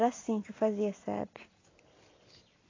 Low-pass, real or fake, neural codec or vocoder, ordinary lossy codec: 7.2 kHz; real; none; AAC, 32 kbps